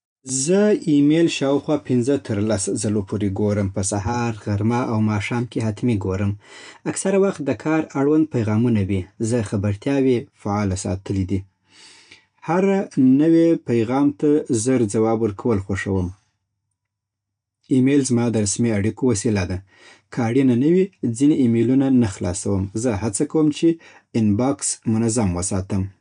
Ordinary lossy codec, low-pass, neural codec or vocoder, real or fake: none; 9.9 kHz; none; real